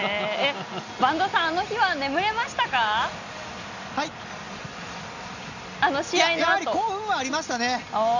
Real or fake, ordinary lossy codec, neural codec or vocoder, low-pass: real; none; none; 7.2 kHz